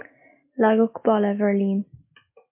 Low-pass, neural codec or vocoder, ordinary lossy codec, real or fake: 3.6 kHz; none; MP3, 24 kbps; real